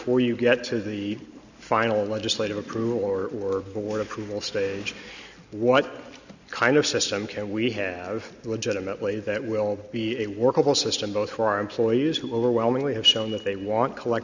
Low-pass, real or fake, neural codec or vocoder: 7.2 kHz; real; none